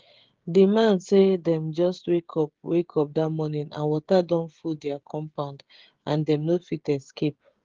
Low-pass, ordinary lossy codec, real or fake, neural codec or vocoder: 7.2 kHz; Opus, 16 kbps; fake; codec, 16 kHz, 16 kbps, FreqCodec, smaller model